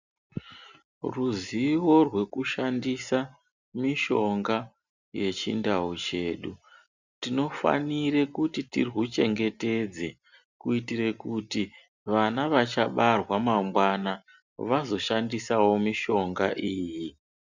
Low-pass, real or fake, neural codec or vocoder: 7.2 kHz; real; none